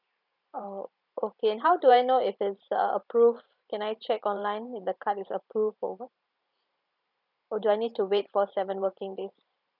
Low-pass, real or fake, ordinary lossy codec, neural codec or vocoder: 5.4 kHz; fake; none; vocoder, 44.1 kHz, 128 mel bands every 512 samples, BigVGAN v2